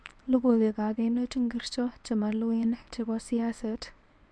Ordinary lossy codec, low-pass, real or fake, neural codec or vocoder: none; none; fake; codec, 24 kHz, 0.9 kbps, WavTokenizer, medium speech release version 1